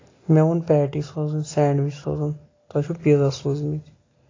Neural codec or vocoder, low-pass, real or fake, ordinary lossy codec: none; 7.2 kHz; real; AAC, 32 kbps